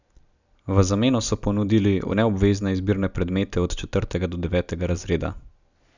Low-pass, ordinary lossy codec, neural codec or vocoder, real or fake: 7.2 kHz; none; none; real